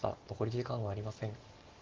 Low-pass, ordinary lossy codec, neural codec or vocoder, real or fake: 7.2 kHz; Opus, 16 kbps; none; real